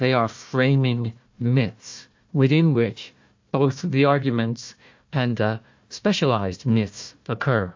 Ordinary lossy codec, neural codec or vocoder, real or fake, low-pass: MP3, 48 kbps; codec, 16 kHz, 1 kbps, FunCodec, trained on Chinese and English, 50 frames a second; fake; 7.2 kHz